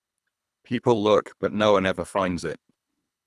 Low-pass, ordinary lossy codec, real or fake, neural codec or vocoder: none; none; fake; codec, 24 kHz, 3 kbps, HILCodec